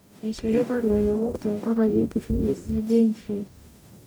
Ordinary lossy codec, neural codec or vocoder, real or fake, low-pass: none; codec, 44.1 kHz, 0.9 kbps, DAC; fake; none